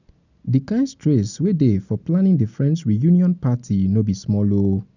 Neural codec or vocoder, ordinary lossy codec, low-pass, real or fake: none; none; 7.2 kHz; real